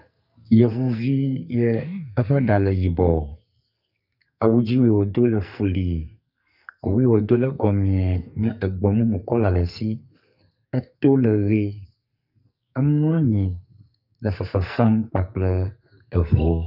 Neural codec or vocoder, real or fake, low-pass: codec, 44.1 kHz, 2.6 kbps, SNAC; fake; 5.4 kHz